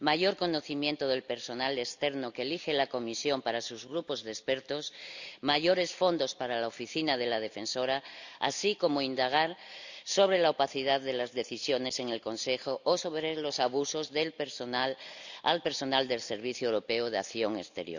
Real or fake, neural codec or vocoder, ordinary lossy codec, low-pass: real; none; none; 7.2 kHz